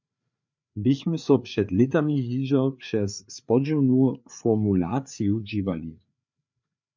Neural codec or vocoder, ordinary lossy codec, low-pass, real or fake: codec, 16 kHz, 4 kbps, FreqCodec, larger model; MP3, 64 kbps; 7.2 kHz; fake